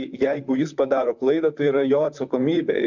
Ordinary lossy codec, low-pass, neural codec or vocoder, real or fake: MP3, 64 kbps; 7.2 kHz; vocoder, 44.1 kHz, 128 mel bands, Pupu-Vocoder; fake